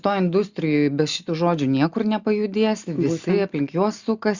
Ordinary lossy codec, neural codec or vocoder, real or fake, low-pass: Opus, 64 kbps; none; real; 7.2 kHz